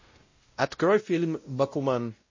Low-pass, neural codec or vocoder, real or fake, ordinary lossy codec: 7.2 kHz; codec, 16 kHz, 0.5 kbps, X-Codec, WavLM features, trained on Multilingual LibriSpeech; fake; MP3, 32 kbps